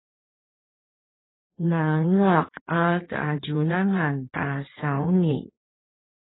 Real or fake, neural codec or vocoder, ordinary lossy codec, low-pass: fake; codec, 16 kHz in and 24 kHz out, 1.1 kbps, FireRedTTS-2 codec; AAC, 16 kbps; 7.2 kHz